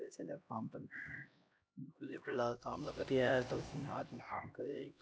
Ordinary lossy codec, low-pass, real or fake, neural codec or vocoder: none; none; fake; codec, 16 kHz, 1 kbps, X-Codec, HuBERT features, trained on LibriSpeech